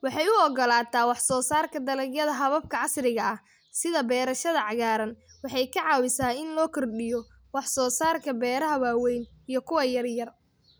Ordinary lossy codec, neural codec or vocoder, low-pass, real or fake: none; none; none; real